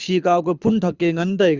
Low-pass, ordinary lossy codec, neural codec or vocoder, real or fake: 7.2 kHz; Opus, 64 kbps; codec, 24 kHz, 6 kbps, HILCodec; fake